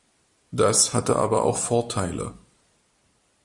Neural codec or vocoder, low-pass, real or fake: none; 10.8 kHz; real